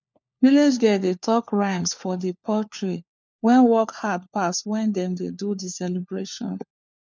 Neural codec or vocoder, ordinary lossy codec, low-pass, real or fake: codec, 16 kHz, 4 kbps, FunCodec, trained on LibriTTS, 50 frames a second; none; none; fake